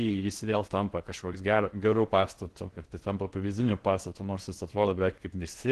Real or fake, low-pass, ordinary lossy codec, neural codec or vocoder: fake; 10.8 kHz; Opus, 16 kbps; codec, 16 kHz in and 24 kHz out, 0.6 kbps, FocalCodec, streaming, 4096 codes